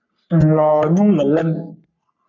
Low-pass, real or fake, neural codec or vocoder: 7.2 kHz; fake; codec, 44.1 kHz, 3.4 kbps, Pupu-Codec